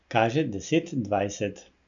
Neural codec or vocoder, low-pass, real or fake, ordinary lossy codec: none; 7.2 kHz; real; none